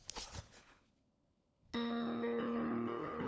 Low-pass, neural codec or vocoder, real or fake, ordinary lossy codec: none; codec, 16 kHz, 16 kbps, FunCodec, trained on LibriTTS, 50 frames a second; fake; none